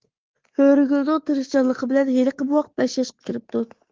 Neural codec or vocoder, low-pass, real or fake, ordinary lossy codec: codec, 24 kHz, 3.1 kbps, DualCodec; 7.2 kHz; fake; Opus, 16 kbps